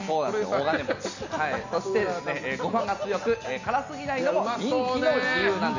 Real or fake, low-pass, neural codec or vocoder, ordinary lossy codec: real; 7.2 kHz; none; none